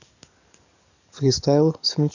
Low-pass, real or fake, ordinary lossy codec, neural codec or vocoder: 7.2 kHz; fake; none; codec, 44.1 kHz, 7.8 kbps, DAC